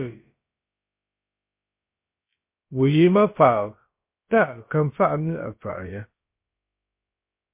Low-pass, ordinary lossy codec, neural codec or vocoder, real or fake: 3.6 kHz; MP3, 24 kbps; codec, 16 kHz, about 1 kbps, DyCAST, with the encoder's durations; fake